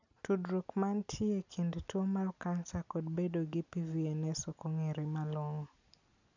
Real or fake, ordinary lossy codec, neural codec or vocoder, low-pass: real; none; none; 7.2 kHz